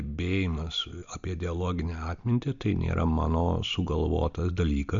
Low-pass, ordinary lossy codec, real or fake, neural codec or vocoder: 7.2 kHz; MP3, 64 kbps; real; none